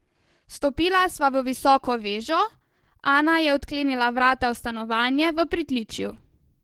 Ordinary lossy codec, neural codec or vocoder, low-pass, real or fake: Opus, 16 kbps; codec, 44.1 kHz, 7.8 kbps, DAC; 19.8 kHz; fake